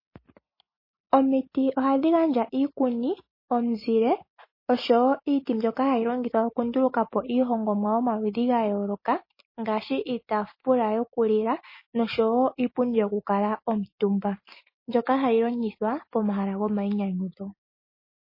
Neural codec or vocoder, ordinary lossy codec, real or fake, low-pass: none; MP3, 24 kbps; real; 5.4 kHz